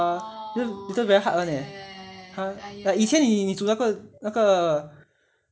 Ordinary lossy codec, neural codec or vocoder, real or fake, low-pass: none; none; real; none